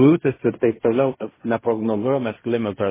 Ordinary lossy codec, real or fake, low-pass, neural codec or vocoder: MP3, 16 kbps; fake; 3.6 kHz; codec, 16 kHz in and 24 kHz out, 0.4 kbps, LongCat-Audio-Codec, fine tuned four codebook decoder